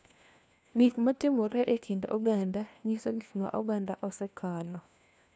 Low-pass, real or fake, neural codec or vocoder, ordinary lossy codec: none; fake; codec, 16 kHz, 1 kbps, FunCodec, trained on LibriTTS, 50 frames a second; none